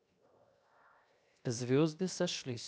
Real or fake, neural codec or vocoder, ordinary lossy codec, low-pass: fake; codec, 16 kHz, 0.7 kbps, FocalCodec; none; none